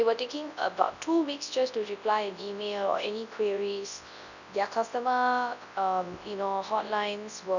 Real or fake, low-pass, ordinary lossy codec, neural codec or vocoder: fake; 7.2 kHz; none; codec, 24 kHz, 0.9 kbps, WavTokenizer, large speech release